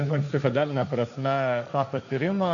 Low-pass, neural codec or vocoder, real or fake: 7.2 kHz; codec, 16 kHz, 1.1 kbps, Voila-Tokenizer; fake